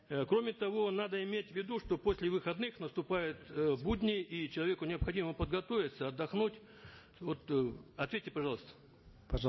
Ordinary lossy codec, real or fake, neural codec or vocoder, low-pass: MP3, 24 kbps; real; none; 7.2 kHz